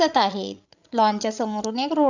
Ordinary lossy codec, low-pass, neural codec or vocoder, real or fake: none; 7.2 kHz; codec, 16 kHz, 16 kbps, FreqCodec, larger model; fake